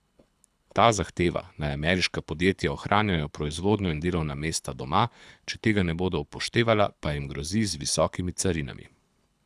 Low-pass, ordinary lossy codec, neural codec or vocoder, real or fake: none; none; codec, 24 kHz, 6 kbps, HILCodec; fake